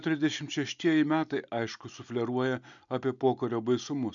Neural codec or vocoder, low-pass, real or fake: none; 7.2 kHz; real